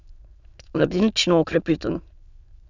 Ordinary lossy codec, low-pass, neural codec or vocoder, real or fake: none; 7.2 kHz; autoencoder, 22.05 kHz, a latent of 192 numbers a frame, VITS, trained on many speakers; fake